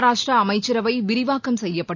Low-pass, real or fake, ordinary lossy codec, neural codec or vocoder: 7.2 kHz; real; none; none